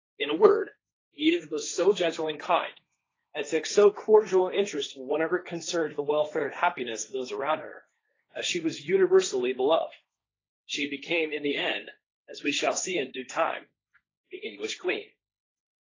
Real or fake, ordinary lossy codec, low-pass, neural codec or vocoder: fake; AAC, 32 kbps; 7.2 kHz; codec, 16 kHz, 1.1 kbps, Voila-Tokenizer